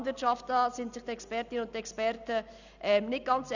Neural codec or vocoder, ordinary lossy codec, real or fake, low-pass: none; none; real; 7.2 kHz